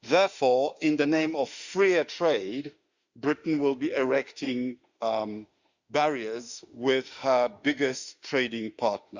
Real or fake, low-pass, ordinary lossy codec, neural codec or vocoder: fake; 7.2 kHz; Opus, 64 kbps; autoencoder, 48 kHz, 32 numbers a frame, DAC-VAE, trained on Japanese speech